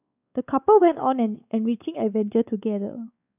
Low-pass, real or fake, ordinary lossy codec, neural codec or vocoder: 3.6 kHz; fake; none; codec, 16 kHz, 4 kbps, X-Codec, WavLM features, trained on Multilingual LibriSpeech